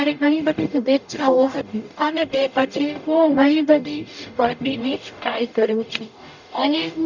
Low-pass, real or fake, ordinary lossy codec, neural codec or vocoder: 7.2 kHz; fake; none; codec, 44.1 kHz, 0.9 kbps, DAC